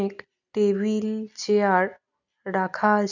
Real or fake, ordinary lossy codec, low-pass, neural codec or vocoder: real; none; 7.2 kHz; none